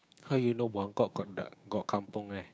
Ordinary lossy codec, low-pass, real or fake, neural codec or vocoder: none; none; fake; codec, 16 kHz, 6 kbps, DAC